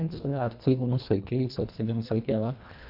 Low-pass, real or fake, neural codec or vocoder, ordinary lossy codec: 5.4 kHz; fake; codec, 24 kHz, 1.5 kbps, HILCodec; none